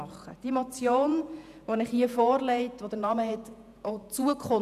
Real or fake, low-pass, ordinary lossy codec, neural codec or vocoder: fake; 14.4 kHz; none; vocoder, 48 kHz, 128 mel bands, Vocos